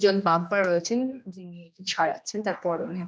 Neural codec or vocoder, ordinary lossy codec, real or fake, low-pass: codec, 16 kHz, 1 kbps, X-Codec, HuBERT features, trained on general audio; none; fake; none